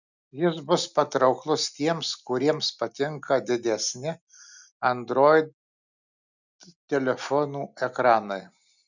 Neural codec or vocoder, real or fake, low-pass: none; real; 7.2 kHz